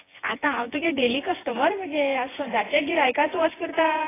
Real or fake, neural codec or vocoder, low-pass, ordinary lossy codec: fake; vocoder, 24 kHz, 100 mel bands, Vocos; 3.6 kHz; AAC, 16 kbps